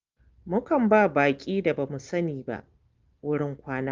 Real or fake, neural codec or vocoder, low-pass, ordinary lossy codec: real; none; 7.2 kHz; Opus, 32 kbps